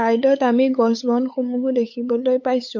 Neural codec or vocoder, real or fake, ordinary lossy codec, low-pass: codec, 16 kHz, 8 kbps, FunCodec, trained on LibriTTS, 25 frames a second; fake; MP3, 48 kbps; 7.2 kHz